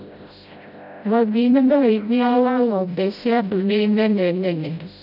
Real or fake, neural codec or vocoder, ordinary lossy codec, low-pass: fake; codec, 16 kHz, 0.5 kbps, FreqCodec, smaller model; none; 5.4 kHz